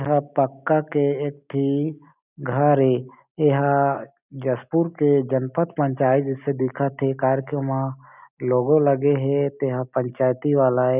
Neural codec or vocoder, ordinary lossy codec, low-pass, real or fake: none; none; 3.6 kHz; real